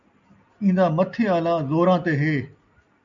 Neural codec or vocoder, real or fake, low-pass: none; real; 7.2 kHz